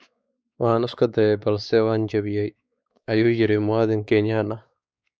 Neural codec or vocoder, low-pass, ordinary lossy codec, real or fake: codec, 16 kHz, 4 kbps, X-Codec, WavLM features, trained on Multilingual LibriSpeech; none; none; fake